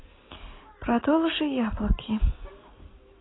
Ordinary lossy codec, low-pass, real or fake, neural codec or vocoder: AAC, 16 kbps; 7.2 kHz; real; none